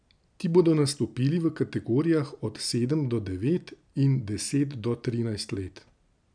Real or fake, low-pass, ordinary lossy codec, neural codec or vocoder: real; 9.9 kHz; none; none